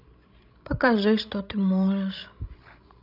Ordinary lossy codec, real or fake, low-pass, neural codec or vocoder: none; fake; 5.4 kHz; codec, 16 kHz, 16 kbps, FreqCodec, larger model